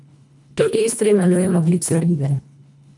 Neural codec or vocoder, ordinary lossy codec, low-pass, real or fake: codec, 24 kHz, 1.5 kbps, HILCodec; none; 10.8 kHz; fake